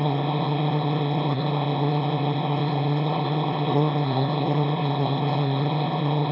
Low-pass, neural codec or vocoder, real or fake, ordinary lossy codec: 5.4 kHz; autoencoder, 22.05 kHz, a latent of 192 numbers a frame, VITS, trained on one speaker; fake; none